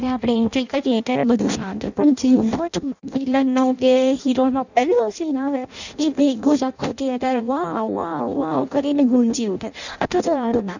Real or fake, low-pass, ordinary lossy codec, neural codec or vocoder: fake; 7.2 kHz; none; codec, 16 kHz in and 24 kHz out, 0.6 kbps, FireRedTTS-2 codec